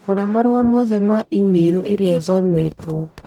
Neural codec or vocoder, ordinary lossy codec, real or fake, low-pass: codec, 44.1 kHz, 0.9 kbps, DAC; none; fake; 19.8 kHz